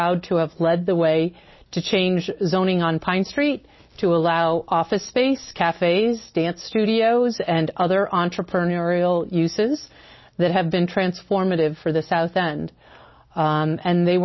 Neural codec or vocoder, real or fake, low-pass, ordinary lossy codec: none; real; 7.2 kHz; MP3, 24 kbps